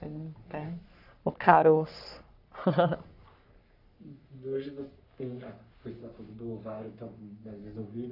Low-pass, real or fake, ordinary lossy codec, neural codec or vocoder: 5.4 kHz; fake; AAC, 48 kbps; codec, 44.1 kHz, 3.4 kbps, Pupu-Codec